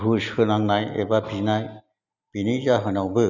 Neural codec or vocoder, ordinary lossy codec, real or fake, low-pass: none; none; real; 7.2 kHz